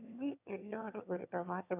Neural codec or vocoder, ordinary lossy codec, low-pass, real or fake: autoencoder, 22.05 kHz, a latent of 192 numbers a frame, VITS, trained on one speaker; none; 3.6 kHz; fake